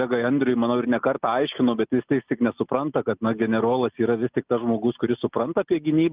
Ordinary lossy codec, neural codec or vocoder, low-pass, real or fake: Opus, 24 kbps; none; 3.6 kHz; real